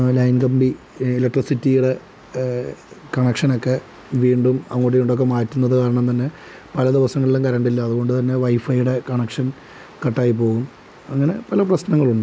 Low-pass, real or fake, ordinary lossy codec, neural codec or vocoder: none; real; none; none